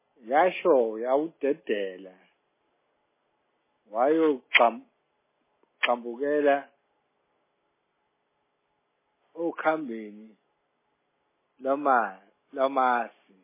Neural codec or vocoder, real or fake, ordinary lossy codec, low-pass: none; real; MP3, 16 kbps; 3.6 kHz